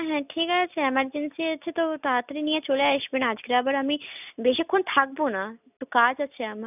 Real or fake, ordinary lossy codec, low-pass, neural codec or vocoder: real; none; 3.6 kHz; none